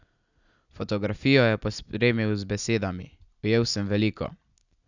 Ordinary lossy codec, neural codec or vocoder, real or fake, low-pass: none; none; real; 7.2 kHz